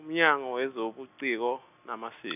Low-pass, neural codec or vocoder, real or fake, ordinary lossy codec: 3.6 kHz; none; real; none